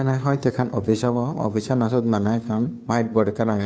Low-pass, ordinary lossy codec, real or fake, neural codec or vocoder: none; none; fake; codec, 16 kHz, 2 kbps, FunCodec, trained on Chinese and English, 25 frames a second